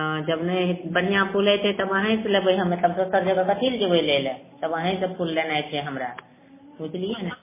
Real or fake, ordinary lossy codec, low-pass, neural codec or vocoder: real; MP3, 16 kbps; 3.6 kHz; none